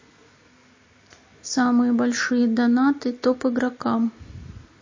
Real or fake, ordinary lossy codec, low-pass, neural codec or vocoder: real; MP3, 32 kbps; 7.2 kHz; none